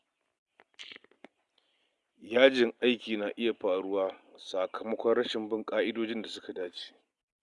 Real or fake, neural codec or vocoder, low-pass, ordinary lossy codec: real; none; 10.8 kHz; Opus, 64 kbps